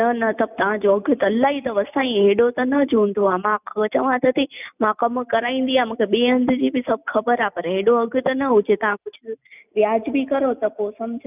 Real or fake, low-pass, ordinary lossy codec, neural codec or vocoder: real; 3.6 kHz; none; none